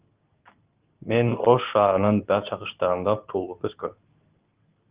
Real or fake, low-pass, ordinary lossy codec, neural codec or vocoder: fake; 3.6 kHz; Opus, 32 kbps; codec, 24 kHz, 0.9 kbps, WavTokenizer, medium speech release version 2